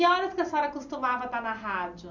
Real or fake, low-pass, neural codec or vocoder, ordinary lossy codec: real; 7.2 kHz; none; none